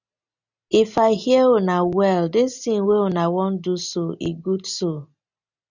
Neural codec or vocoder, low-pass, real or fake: none; 7.2 kHz; real